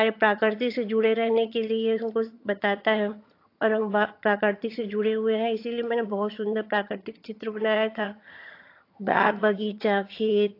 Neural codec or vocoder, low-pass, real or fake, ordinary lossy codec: vocoder, 22.05 kHz, 80 mel bands, HiFi-GAN; 5.4 kHz; fake; AAC, 32 kbps